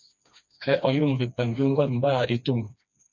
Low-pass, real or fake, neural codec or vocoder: 7.2 kHz; fake; codec, 16 kHz, 2 kbps, FreqCodec, smaller model